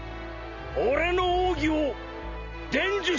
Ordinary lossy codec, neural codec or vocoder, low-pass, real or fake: none; none; 7.2 kHz; real